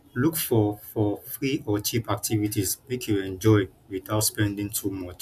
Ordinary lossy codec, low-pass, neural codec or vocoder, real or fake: none; 14.4 kHz; none; real